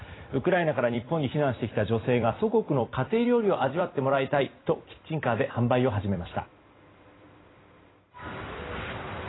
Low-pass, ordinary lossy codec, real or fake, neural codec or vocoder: 7.2 kHz; AAC, 16 kbps; fake; vocoder, 44.1 kHz, 128 mel bands every 256 samples, BigVGAN v2